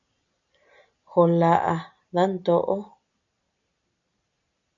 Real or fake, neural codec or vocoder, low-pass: real; none; 7.2 kHz